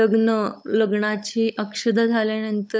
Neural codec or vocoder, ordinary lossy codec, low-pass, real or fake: codec, 16 kHz, 16 kbps, FunCodec, trained on LibriTTS, 50 frames a second; none; none; fake